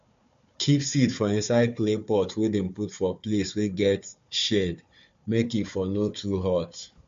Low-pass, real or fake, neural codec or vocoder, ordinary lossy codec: 7.2 kHz; fake; codec, 16 kHz, 4 kbps, FunCodec, trained on Chinese and English, 50 frames a second; MP3, 48 kbps